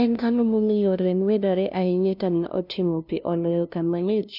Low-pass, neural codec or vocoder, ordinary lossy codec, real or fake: 5.4 kHz; codec, 16 kHz, 0.5 kbps, FunCodec, trained on LibriTTS, 25 frames a second; none; fake